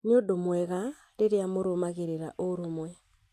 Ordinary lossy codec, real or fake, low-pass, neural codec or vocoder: AAC, 64 kbps; real; 14.4 kHz; none